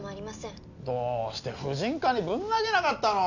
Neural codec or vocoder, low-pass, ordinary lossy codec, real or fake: none; 7.2 kHz; none; real